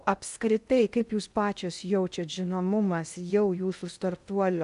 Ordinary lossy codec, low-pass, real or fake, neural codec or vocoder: AAC, 96 kbps; 10.8 kHz; fake; codec, 16 kHz in and 24 kHz out, 0.6 kbps, FocalCodec, streaming, 2048 codes